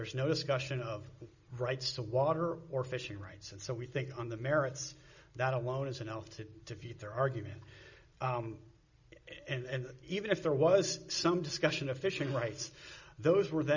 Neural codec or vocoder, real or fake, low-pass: none; real; 7.2 kHz